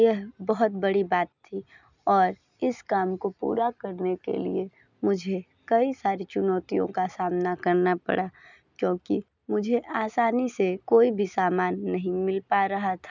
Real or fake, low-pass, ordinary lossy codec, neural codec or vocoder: real; 7.2 kHz; none; none